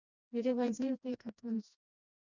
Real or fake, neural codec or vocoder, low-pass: fake; codec, 16 kHz, 1 kbps, FreqCodec, smaller model; 7.2 kHz